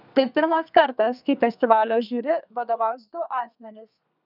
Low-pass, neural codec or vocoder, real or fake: 5.4 kHz; codec, 32 kHz, 1.9 kbps, SNAC; fake